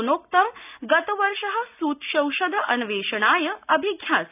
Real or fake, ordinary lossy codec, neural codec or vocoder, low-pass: real; none; none; 3.6 kHz